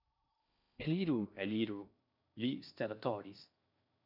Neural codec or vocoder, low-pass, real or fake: codec, 16 kHz in and 24 kHz out, 0.6 kbps, FocalCodec, streaming, 4096 codes; 5.4 kHz; fake